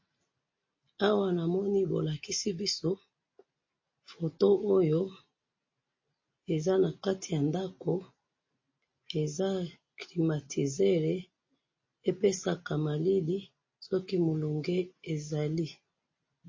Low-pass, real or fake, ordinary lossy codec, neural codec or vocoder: 7.2 kHz; real; MP3, 32 kbps; none